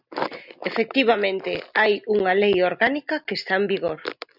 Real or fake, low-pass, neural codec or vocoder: real; 5.4 kHz; none